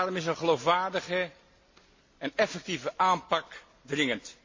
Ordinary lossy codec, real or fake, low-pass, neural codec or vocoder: MP3, 32 kbps; real; 7.2 kHz; none